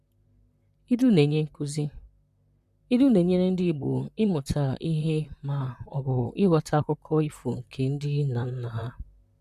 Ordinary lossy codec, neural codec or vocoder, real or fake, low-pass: none; vocoder, 44.1 kHz, 128 mel bands, Pupu-Vocoder; fake; 14.4 kHz